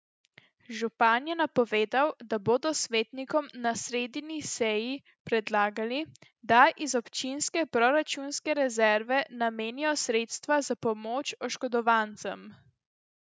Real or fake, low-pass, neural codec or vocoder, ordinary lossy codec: real; none; none; none